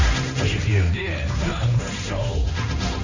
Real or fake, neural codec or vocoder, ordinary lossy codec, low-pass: fake; codec, 16 kHz, 1.1 kbps, Voila-Tokenizer; none; 7.2 kHz